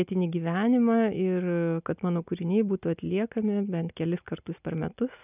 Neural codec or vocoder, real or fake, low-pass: none; real; 3.6 kHz